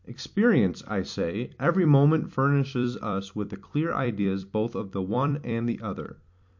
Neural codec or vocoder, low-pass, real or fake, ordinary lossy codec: vocoder, 44.1 kHz, 128 mel bands every 256 samples, BigVGAN v2; 7.2 kHz; fake; MP3, 48 kbps